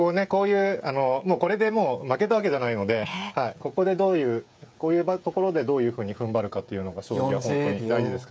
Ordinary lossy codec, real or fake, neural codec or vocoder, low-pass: none; fake; codec, 16 kHz, 16 kbps, FreqCodec, smaller model; none